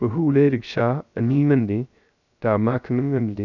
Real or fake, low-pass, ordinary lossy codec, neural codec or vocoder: fake; 7.2 kHz; none; codec, 16 kHz, 0.3 kbps, FocalCodec